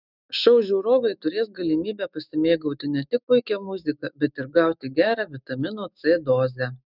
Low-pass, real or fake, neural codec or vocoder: 5.4 kHz; fake; autoencoder, 48 kHz, 128 numbers a frame, DAC-VAE, trained on Japanese speech